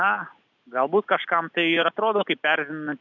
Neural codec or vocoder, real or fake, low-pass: none; real; 7.2 kHz